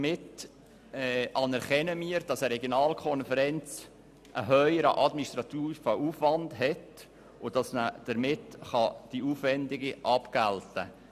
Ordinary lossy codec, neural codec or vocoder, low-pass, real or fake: none; none; 14.4 kHz; real